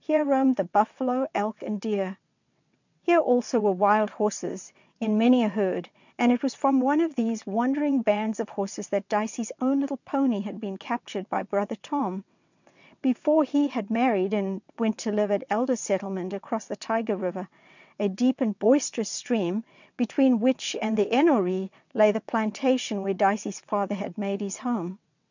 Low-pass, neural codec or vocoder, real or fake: 7.2 kHz; vocoder, 22.05 kHz, 80 mel bands, WaveNeXt; fake